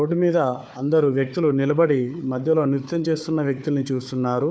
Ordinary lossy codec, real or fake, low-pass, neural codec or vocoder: none; fake; none; codec, 16 kHz, 4 kbps, FunCodec, trained on Chinese and English, 50 frames a second